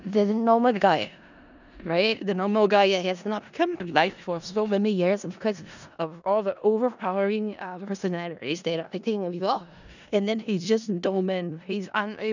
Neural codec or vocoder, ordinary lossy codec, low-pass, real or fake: codec, 16 kHz in and 24 kHz out, 0.4 kbps, LongCat-Audio-Codec, four codebook decoder; none; 7.2 kHz; fake